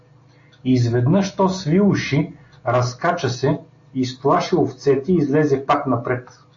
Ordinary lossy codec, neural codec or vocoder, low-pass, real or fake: AAC, 48 kbps; none; 7.2 kHz; real